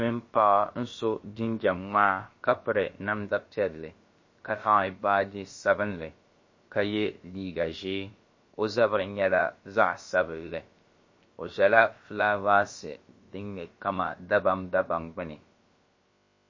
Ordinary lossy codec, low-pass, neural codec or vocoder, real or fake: MP3, 32 kbps; 7.2 kHz; codec, 16 kHz, about 1 kbps, DyCAST, with the encoder's durations; fake